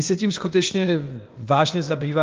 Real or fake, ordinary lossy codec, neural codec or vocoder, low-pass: fake; Opus, 24 kbps; codec, 16 kHz, 0.8 kbps, ZipCodec; 7.2 kHz